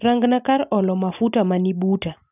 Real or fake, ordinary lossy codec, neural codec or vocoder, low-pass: real; none; none; 3.6 kHz